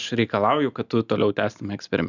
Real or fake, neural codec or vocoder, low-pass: real; none; 7.2 kHz